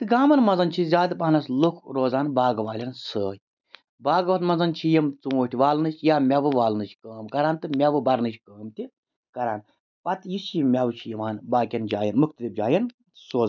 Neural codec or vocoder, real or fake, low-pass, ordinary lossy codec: none; real; 7.2 kHz; none